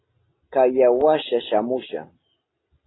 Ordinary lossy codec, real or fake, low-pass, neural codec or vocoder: AAC, 16 kbps; real; 7.2 kHz; none